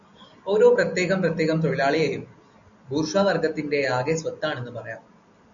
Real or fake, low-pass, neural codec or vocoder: real; 7.2 kHz; none